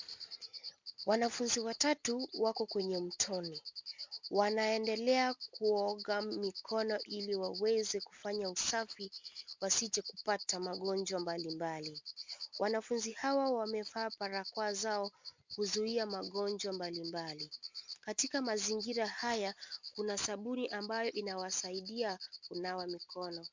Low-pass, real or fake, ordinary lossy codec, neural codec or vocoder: 7.2 kHz; real; MP3, 64 kbps; none